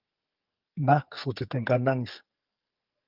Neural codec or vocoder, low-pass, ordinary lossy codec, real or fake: codec, 44.1 kHz, 2.6 kbps, SNAC; 5.4 kHz; Opus, 24 kbps; fake